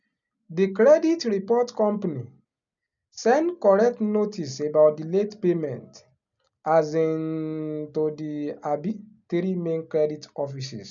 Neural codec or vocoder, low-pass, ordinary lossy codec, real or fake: none; 7.2 kHz; MP3, 96 kbps; real